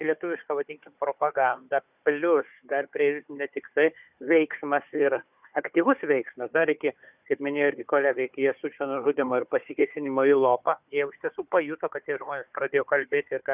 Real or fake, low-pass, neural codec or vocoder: fake; 3.6 kHz; codec, 16 kHz, 4 kbps, FunCodec, trained on Chinese and English, 50 frames a second